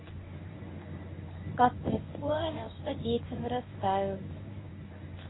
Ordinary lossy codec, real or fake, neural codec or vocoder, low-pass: AAC, 16 kbps; fake; codec, 24 kHz, 0.9 kbps, WavTokenizer, medium speech release version 1; 7.2 kHz